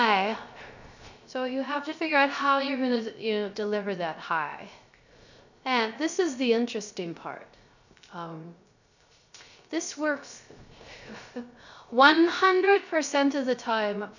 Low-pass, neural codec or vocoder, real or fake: 7.2 kHz; codec, 16 kHz, 0.3 kbps, FocalCodec; fake